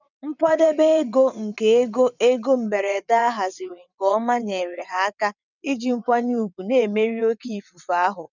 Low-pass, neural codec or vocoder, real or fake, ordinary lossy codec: 7.2 kHz; vocoder, 22.05 kHz, 80 mel bands, WaveNeXt; fake; none